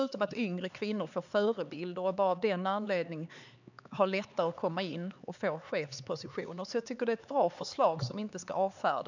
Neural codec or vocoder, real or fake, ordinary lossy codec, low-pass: codec, 16 kHz, 4 kbps, X-Codec, HuBERT features, trained on LibriSpeech; fake; none; 7.2 kHz